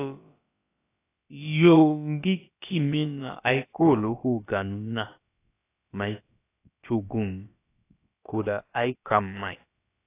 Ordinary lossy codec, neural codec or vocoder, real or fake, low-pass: AAC, 24 kbps; codec, 16 kHz, about 1 kbps, DyCAST, with the encoder's durations; fake; 3.6 kHz